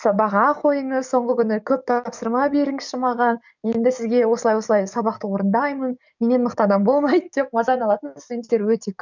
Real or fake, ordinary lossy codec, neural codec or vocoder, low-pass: fake; none; codec, 44.1 kHz, 7.8 kbps, DAC; 7.2 kHz